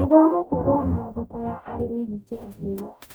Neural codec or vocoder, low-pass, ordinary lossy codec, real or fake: codec, 44.1 kHz, 0.9 kbps, DAC; none; none; fake